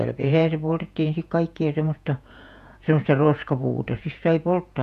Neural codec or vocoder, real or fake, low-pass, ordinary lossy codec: vocoder, 48 kHz, 128 mel bands, Vocos; fake; 14.4 kHz; none